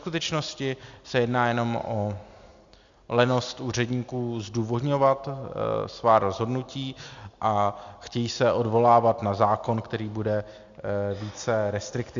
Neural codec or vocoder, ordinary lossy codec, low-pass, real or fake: none; Opus, 64 kbps; 7.2 kHz; real